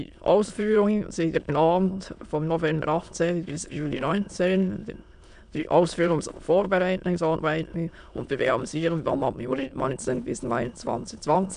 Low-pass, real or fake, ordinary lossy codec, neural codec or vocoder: 9.9 kHz; fake; AAC, 96 kbps; autoencoder, 22.05 kHz, a latent of 192 numbers a frame, VITS, trained on many speakers